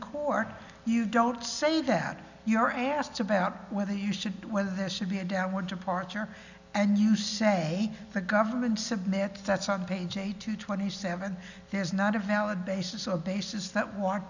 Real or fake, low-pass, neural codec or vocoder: real; 7.2 kHz; none